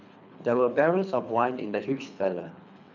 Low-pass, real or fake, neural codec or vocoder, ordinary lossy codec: 7.2 kHz; fake; codec, 24 kHz, 3 kbps, HILCodec; none